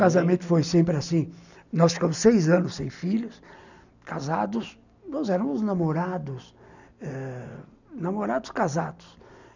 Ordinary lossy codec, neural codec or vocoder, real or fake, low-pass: none; none; real; 7.2 kHz